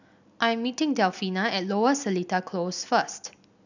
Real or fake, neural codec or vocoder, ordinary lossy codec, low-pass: real; none; none; 7.2 kHz